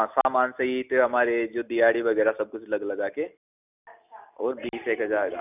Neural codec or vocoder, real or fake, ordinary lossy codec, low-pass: none; real; none; 3.6 kHz